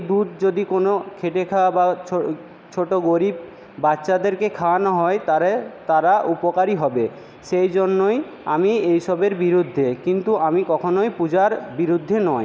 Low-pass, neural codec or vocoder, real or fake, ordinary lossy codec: none; none; real; none